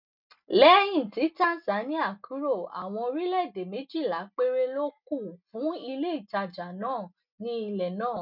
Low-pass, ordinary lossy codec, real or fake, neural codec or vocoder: 5.4 kHz; none; real; none